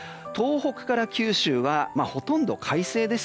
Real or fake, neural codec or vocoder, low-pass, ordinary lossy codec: real; none; none; none